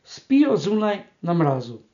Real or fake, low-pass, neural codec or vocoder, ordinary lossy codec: real; 7.2 kHz; none; none